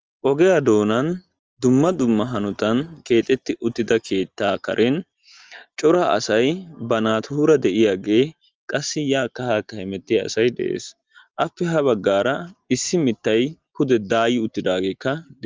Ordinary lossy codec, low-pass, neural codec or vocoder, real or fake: Opus, 24 kbps; 7.2 kHz; none; real